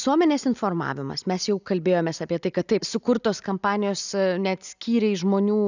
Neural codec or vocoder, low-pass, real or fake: none; 7.2 kHz; real